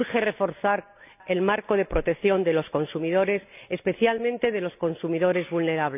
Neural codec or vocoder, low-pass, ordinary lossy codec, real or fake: none; 3.6 kHz; none; real